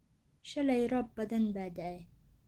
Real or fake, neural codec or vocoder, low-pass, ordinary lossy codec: real; none; 19.8 kHz; Opus, 16 kbps